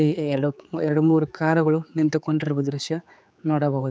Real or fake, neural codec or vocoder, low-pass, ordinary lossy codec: fake; codec, 16 kHz, 4 kbps, X-Codec, HuBERT features, trained on general audio; none; none